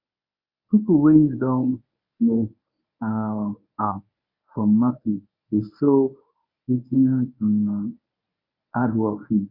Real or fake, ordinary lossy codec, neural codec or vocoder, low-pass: fake; Opus, 64 kbps; codec, 24 kHz, 0.9 kbps, WavTokenizer, medium speech release version 1; 5.4 kHz